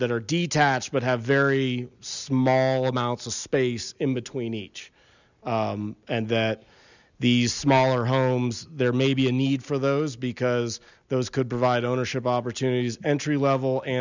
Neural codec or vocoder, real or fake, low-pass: none; real; 7.2 kHz